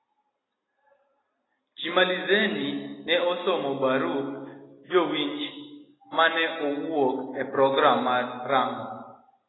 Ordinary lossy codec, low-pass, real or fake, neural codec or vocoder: AAC, 16 kbps; 7.2 kHz; real; none